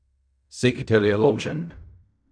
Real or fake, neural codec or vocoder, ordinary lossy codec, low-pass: fake; codec, 16 kHz in and 24 kHz out, 0.4 kbps, LongCat-Audio-Codec, fine tuned four codebook decoder; none; 9.9 kHz